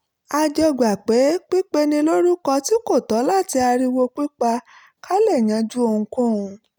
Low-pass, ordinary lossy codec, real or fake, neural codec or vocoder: none; none; real; none